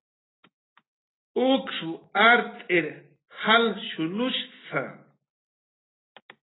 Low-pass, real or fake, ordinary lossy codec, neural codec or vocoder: 7.2 kHz; real; AAC, 16 kbps; none